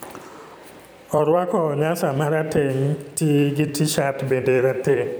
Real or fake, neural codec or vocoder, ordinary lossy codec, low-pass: fake; vocoder, 44.1 kHz, 128 mel bands every 256 samples, BigVGAN v2; none; none